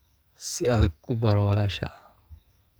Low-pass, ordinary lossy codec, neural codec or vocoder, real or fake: none; none; codec, 44.1 kHz, 2.6 kbps, SNAC; fake